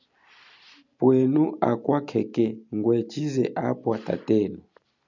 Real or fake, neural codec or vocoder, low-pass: real; none; 7.2 kHz